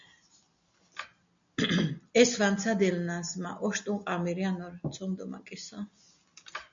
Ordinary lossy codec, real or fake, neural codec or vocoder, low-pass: MP3, 64 kbps; real; none; 7.2 kHz